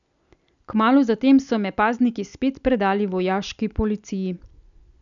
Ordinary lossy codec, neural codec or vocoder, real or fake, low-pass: none; none; real; 7.2 kHz